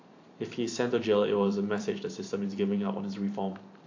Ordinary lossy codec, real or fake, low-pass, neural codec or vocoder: AAC, 48 kbps; fake; 7.2 kHz; vocoder, 44.1 kHz, 128 mel bands every 512 samples, BigVGAN v2